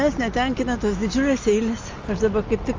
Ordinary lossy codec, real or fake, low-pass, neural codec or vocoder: Opus, 32 kbps; real; 7.2 kHz; none